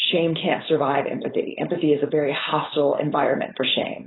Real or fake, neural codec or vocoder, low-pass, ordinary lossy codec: fake; codec, 16 kHz, 4.8 kbps, FACodec; 7.2 kHz; AAC, 16 kbps